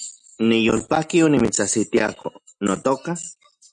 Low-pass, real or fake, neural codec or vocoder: 9.9 kHz; real; none